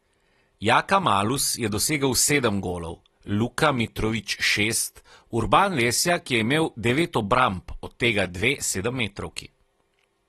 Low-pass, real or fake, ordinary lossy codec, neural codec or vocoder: 19.8 kHz; real; AAC, 32 kbps; none